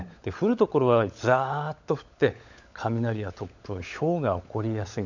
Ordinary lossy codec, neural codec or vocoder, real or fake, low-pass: none; codec, 16 kHz, 16 kbps, FunCodec, trained on LibriTTS, 50 frames a second; fake; 7.2 kHz